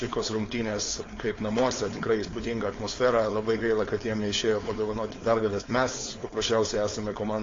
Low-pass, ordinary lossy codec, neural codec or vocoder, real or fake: 7.2 kHz; AAC, 32 kbps; codec, 16 kHz, 4.8 kbps, FACodec; fake